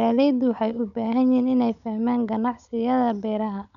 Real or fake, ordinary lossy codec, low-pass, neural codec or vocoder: fake; none; 7.2 kHz; codec, 16 kHz, 16 kbps, FunCodec, trained on Chinese and English, 50 frames a second